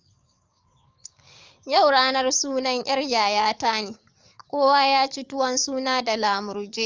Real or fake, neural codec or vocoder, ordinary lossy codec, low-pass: fake; codec, 44.1 kHz, 7.8 kbps, DAC; Opus, 32 kbps; 7.2 kHz